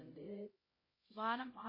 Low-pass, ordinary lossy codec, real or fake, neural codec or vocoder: 5.4 kHz; MP3, 24 kbps; fake; codec, 16 kHz, 0.5 kbps, X-Codec, HuBERT features, trained on LibriSpeech